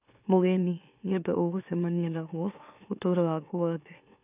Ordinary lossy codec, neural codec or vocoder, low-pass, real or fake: none; autoencoder, 44.1 kHz, a latent of 192 numbers a frame, MeloTTS; 3.6 kHz; fake